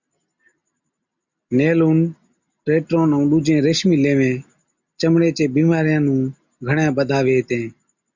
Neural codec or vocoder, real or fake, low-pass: none; real; 7.2 kHz